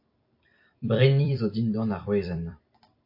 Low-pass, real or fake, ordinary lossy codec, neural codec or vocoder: 5.4 kHz; fake; AAC, 32 kbps; vocoder, 44.1 kHz, 128 mel bands every 256 samples, BigVGAN v2